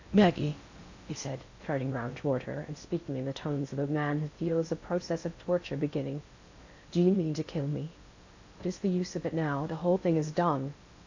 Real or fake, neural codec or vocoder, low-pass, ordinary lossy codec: fake; codec, 16 kHz in and 24 kHz out, 0.6 kbps, FocalCodec, streaming, 4096 codes; 7.2 kHz; AAC, 48 kbps